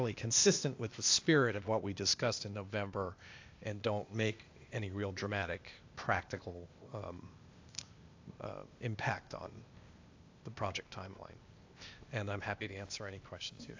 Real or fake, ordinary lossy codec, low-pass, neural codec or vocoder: fake; AAC, 48 kbps; 7.2 kHz; codec, 16 kHz, 0.8 kbps, ZipCodec